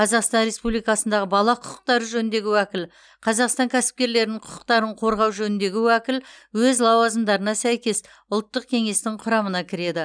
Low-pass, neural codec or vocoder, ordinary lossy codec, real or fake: 9.9 kHz; none; none; real